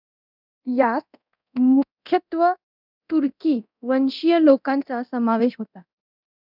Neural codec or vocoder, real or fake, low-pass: codec, 24 kHz, 0.9 kbps, DualCodec; fake; 5.4 kHz